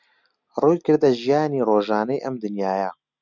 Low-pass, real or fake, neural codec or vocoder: 7.2 kHz; real; none